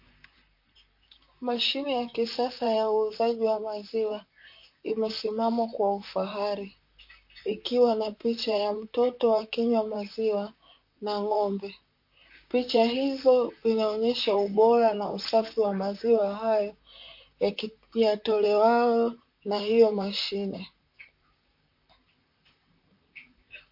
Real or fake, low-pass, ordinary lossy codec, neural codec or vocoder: fake; 5.4 kHz; MP3, 32 kbps; vocoder, 44.1 kHz, 128 mel bands, Pupu-Vocoder